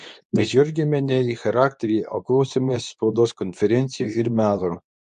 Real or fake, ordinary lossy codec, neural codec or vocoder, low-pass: fake; AAC, 96 kbps; codec, 24 kHz, 0.9 kbps, WavTokenizer, medium speech release version 2; 10.8 kHz